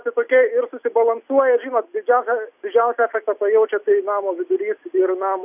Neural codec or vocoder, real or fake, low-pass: none; real; 3.6 kHz